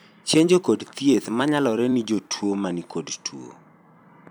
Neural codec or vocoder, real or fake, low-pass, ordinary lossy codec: vocoder, 44.1 kHz, 128 mel bands every 256 samples, BigVGAN v2; fake; none; none